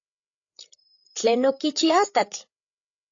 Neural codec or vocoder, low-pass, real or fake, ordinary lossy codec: codec, 16 kHz, 8 kbps, FreqCodec, larger model; 7.2 kHz; fake; AAC, 48 kbps